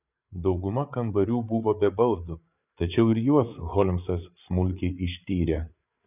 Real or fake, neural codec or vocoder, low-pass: fake; codec, 16 kHz, 8 kbps, FreqCodec, larger model; 3.6 kHz